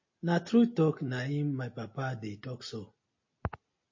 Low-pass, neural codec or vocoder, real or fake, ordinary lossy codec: 7.2 kHz; none; real; MP3, 32 kbps